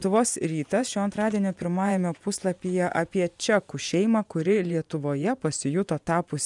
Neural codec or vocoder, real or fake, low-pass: vocoder, 24 kHz, 100 mel bands, Vocos; fake; 10.8 kHz